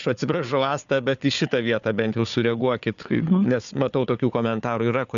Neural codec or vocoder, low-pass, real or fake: codec, 16 kHz, 4 kbps, FunCodec, trained on Chinese and English, 50 frames a second; 7.2 kHz; fake